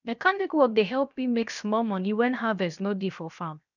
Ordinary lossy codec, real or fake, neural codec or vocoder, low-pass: none; fake; codec, 16 kHz, 0.7 kbps, FocalCodec; 7.2 kHz